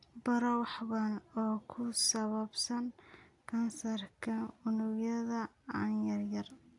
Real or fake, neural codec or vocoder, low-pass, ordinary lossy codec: real; none; 10.8 kHz; none